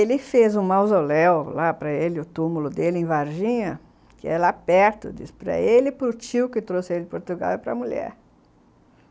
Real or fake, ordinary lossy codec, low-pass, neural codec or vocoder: real; none; none; none